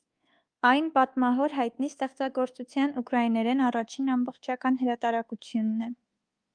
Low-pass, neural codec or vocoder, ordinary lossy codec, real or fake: 9.9 kHz; codec, 24 kHz, 1.2 kbps, DualCodec; Opus, 24 kbps; fake